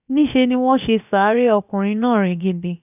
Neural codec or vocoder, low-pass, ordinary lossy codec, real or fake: codec, 16 kHz, about 1 kbps, DyCAST, with the encoder's durations; 3.6 kHz; none; fake